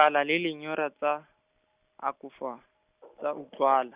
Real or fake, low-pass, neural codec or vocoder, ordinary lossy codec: real; 3.6 kHz; none; Opus, 64 kbps